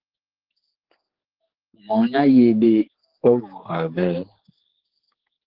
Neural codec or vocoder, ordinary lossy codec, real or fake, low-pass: codec, 16 kHz, 4 kbps, X-Codec, HuBERT features, trained on general audio; Opus, 16 kbps; fake; 5.4 kHz